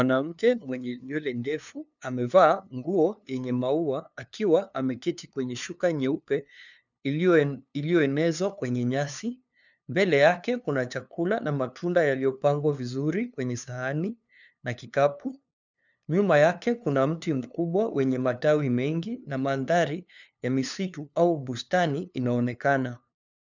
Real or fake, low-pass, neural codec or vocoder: fake; 7.2 kHz; codec, 16 kHz, 2 kbps, FunCodec, trained on LibriTTS, 25 frames a second